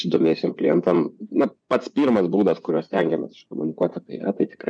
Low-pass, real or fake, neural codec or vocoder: 9.9 kHz; fake; vocoder, 24 kHz, 100 mel bands, Vocos